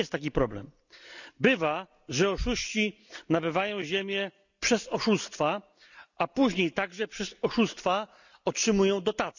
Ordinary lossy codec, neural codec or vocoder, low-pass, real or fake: none; none; 7.2 kHz; real